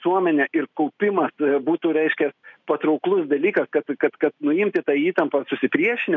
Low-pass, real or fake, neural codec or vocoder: 7.2 kHz; real; none